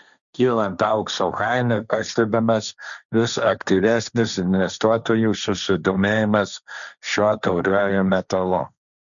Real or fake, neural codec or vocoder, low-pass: fake; codec, 16 kHz, 1.1 kbps, Voila-Tokenizer; 7.2 kHz